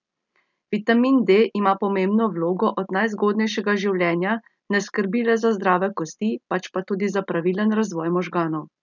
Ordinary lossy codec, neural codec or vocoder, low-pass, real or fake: none; none; 7.2 kHz; real